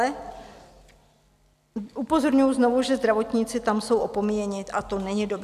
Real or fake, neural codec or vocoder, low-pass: real; none; 14.4 kHz